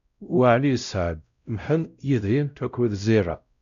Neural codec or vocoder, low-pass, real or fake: codec, 16 kHz, 0.5 kbps, X-Codec, WavLM features, trained on Multilingual LibriSpeech; 7.2 kHz; fake